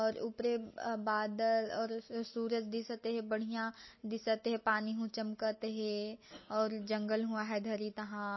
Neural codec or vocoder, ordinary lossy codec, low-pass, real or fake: none; MP3, 24 kbps; 7.2 kHz; real